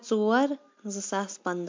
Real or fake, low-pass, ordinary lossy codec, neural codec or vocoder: real; 7.2 kHz; MP3, 48 kbps; none